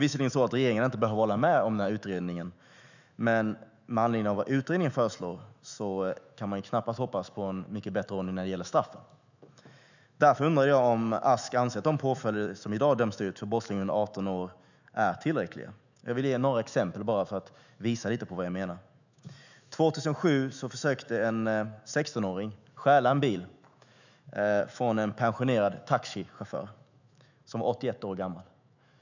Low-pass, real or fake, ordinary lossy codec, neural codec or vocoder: 7.2 kHz; fake; none; autoencoder, 48 kHz, 128 numbers a frame, DAC-VAE, trained on Japanese speech